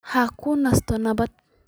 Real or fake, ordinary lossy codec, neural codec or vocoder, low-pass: real; none; none; none